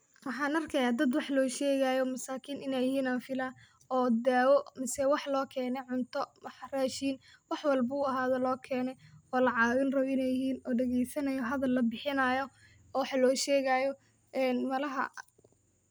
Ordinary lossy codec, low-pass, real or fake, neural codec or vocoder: none; none; real; none